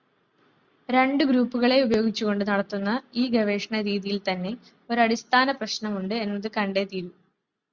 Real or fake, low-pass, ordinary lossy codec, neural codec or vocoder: real; 7.2 kHz; Opus, 64 kbps; none